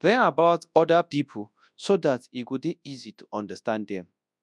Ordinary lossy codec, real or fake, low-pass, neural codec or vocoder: none; fake; none; codec, 24 kHz, 0.9 kbps, WavTokenizer, large speech release